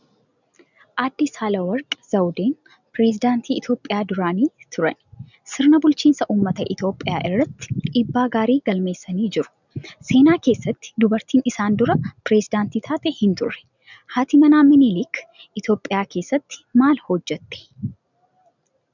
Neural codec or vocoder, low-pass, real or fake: none; 7.2 kHz; real